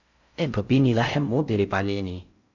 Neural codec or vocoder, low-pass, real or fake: codec, 16 kHz in and 24 kHz out, 0.6 kbps, FocalCodec, streaming, 4096 codes; 7.2 kHz; fake